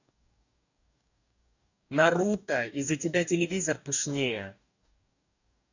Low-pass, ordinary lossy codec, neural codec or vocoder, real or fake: 7.2 kHz; none; codec, 44.1 kHz, 2.6 kbps, DAC; fake